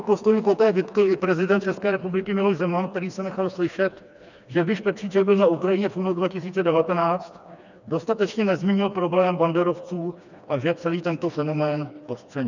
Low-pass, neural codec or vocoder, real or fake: 7.2 kHz; codec, 16 kHz, 2 kbps, FreqCodec, smaller model; fake